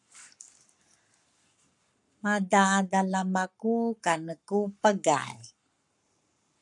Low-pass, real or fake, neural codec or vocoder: 10.8 kHz; fake; codec, 44.1 kHz, 7.8 kbps, Pupu-Codec